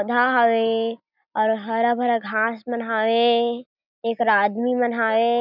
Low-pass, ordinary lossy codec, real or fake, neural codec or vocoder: 5.4 kHz; none; real; none